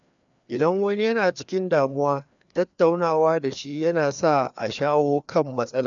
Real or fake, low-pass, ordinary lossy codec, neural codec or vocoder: fake; 7.2 kHz; none; codec, 16 kHz, 2 kbps, FreqCodec, larger model